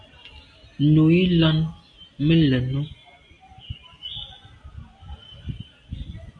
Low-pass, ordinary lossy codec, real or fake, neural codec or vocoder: 9.9 kHz; MP3, 64 kbps; real; none